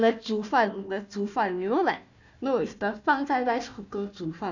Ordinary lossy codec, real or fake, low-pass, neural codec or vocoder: none; fake; 7.2 kHz; codec, 16 kHz, 1 kbps, FunCodec, trained on Chinese and English, 50 frames a second